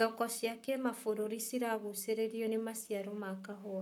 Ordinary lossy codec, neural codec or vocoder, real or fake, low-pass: none; codec, 44.1 kHz, 7.8 kbps, Pupu-Codec; fake; 19.8 kHz